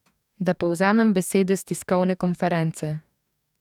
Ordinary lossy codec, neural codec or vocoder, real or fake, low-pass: none; codec, 44.1 kHz, 2.6 kbps, DAC; fake; 19.8 kHz